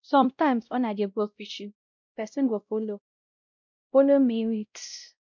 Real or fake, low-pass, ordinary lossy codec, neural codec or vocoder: fake; 7.2 kHz; MP3, 64 kbps; codec, 16 kHz, 0.5 kbps, X-Codec, WavLM features, trained on Multilingual LibriSpeech